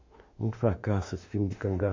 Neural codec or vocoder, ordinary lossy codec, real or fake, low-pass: autoencoder, 48 kHz, 32 numbers a frame, DAC-VAE, trained on Japanese speech; MP3, 48 kbps; fake; 7.2 kHz